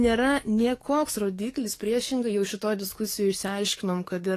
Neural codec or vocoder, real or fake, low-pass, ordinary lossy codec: codec, 44.1 kHz, 7.8 kbps, DAC; fake; 14.4 kHz; AAC, 48 kbps